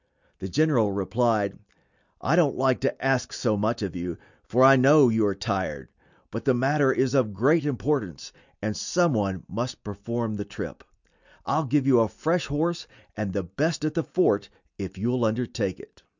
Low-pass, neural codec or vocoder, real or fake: 7.2 kHz; none; real